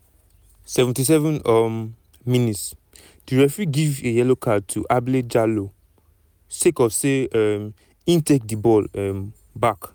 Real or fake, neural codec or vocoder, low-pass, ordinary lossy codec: real; none; none; none